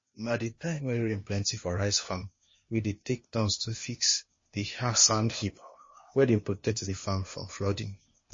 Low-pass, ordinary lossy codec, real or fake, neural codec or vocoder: 7.2 kHz; MP3, 32 kbps; fake; codec, 16 kHz, 0.8 kbps, ZipCodec